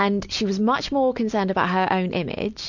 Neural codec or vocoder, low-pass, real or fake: none; 7.2 kHz; real